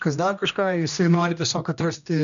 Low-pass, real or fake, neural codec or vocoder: 7.2 kHz; fake; codec, 16 kHz, 1 kbps, X-Codec, HuBERT features, trained on general audio